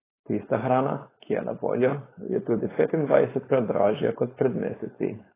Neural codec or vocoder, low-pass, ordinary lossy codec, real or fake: codec, 16 kHz, 4.8 kbps, FACodec; 3.6 kHz; AAC, 16 kbps; fake